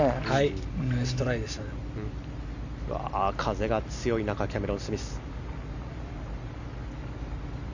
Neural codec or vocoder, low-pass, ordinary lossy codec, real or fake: none; 7.2 kHz; none; real